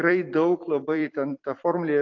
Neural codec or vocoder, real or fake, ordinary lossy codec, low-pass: vocoder, 44.1 kHz, 128 mel bands every 256 samples, BigVGAN v2; fake; AAC, 48 kbps; 7.2 kHz